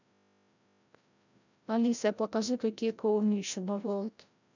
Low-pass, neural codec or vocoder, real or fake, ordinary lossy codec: 7.2 kHz; codec, 16 kHz, 0.5 kbps, FreqCodec, larger model; fake; none